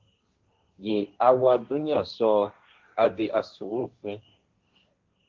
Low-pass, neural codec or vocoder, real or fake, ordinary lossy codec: 7.2 kHz; codec, 16 kHz, 1.1 kbps, Voila-Tokenizer; fake; Opus, 32 kbps